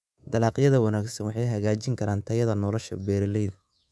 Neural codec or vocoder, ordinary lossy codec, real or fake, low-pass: codec, 24 kHz, 3.1 kbps, DualCodec; none; fake; none